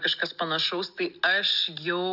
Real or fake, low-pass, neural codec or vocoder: real; 5.4 kHz; none